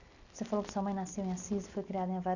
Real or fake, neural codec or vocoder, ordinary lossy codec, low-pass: real; none; none; 7.2 kHz